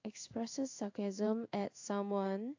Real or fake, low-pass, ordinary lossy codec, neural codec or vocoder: fake; 7.2 kHz; AAC, 48 kbps; codec, 16 kHz in and 24 kHz out, 1 kbps, XY-Tokenizer